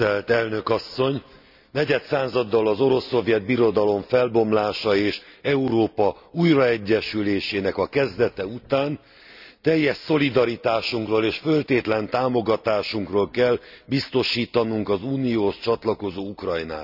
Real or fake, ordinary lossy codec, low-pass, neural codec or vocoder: real; none; 5.4 kHz; none